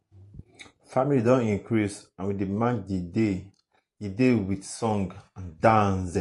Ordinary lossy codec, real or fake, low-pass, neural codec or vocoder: MP3, 48 kbps; fake; 14.4 kHz; vocoder, 48 kHz, 128 mel bands, Vocos